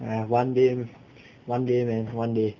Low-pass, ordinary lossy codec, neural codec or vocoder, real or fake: 7.2 kHz; none; none; real